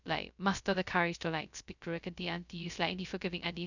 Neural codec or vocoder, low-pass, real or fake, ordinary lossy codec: codec, 16 kHz, 0.2 kbps, FocalCodec; 7.2 kHz; fake; none